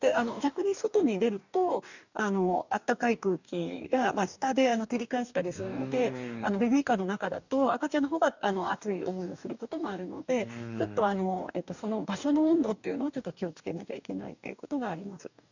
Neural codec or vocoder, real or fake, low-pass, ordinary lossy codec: codec, 44.1 kHz, 2.6 kbps, DAC; fake; 7.2 kHz; none